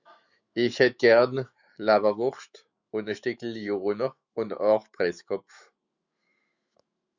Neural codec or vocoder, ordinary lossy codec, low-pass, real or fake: autoencoder, 48 kHz, 128 numbers a frame, DAC-VAE, trained on Japanese speech; Opus, 64 kbps; 7.2 kHz; fake